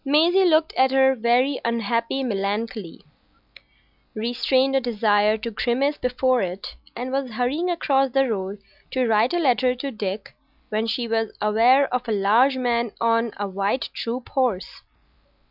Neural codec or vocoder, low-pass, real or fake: none; 5.4 kHz; real